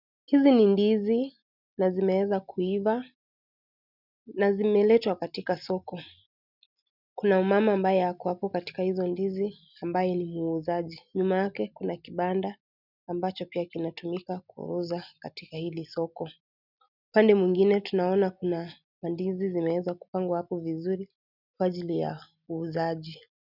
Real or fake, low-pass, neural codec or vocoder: real; 5.4 kHz; none